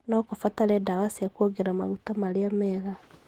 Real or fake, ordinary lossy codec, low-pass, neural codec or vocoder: fake; Opus, 16 kbps; 19.8 kHz; autoencoder, 48 kHz, 128 numbers a frame, DAC-VAE, trained on Japanese speech